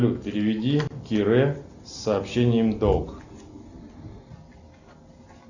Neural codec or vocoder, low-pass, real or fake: none; 7.2 kHz; real